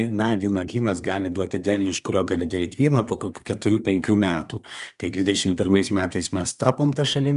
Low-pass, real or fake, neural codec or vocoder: 10.8 kHz; fake; codec, 24 kHz, 1 kbps, SNAC